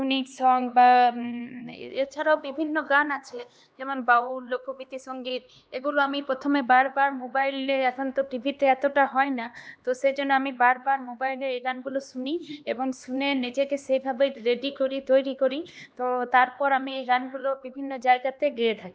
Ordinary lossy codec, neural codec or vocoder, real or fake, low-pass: none; codec, 16 kHz, 2 kbps, X-Codec, HuBERT features, trained on LibriSpeech; fake; none